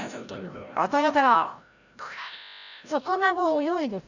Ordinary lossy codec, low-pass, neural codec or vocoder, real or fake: none; 7.2 kHz; codec, 16 kHz, 0.5 kbps, FreqCodec, larger model; fake